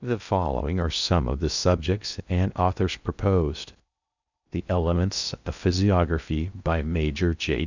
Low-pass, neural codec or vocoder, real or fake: 7.2 kHz; codec, 16 kHz in and 24 kHz out, 0.6 kbps, FocalCodec, streaming, 2048 codes; fake